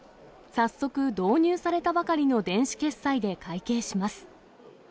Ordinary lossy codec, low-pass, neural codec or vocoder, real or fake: none; none; none; real